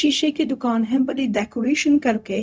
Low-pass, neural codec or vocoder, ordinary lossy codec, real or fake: none; codec, 16 kHz, 0.4 kbps, LongCat-Audio-Codec; none; fake